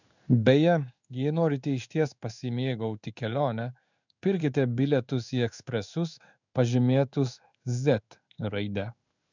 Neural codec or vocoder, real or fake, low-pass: codec, 16 kHz in and 24 kHz out, 1 kbps, XY-Tokenizer; fake; 7.2 kHz